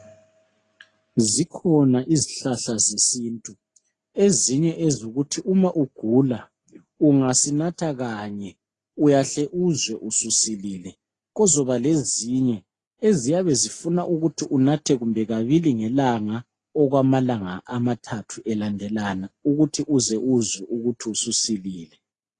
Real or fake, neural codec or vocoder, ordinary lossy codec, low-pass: real; none; AAC, 32 kbps; 10.8 kHz